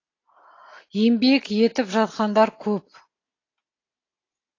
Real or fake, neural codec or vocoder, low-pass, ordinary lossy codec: real; none; 7.2 kHz; AAC, 32 kbps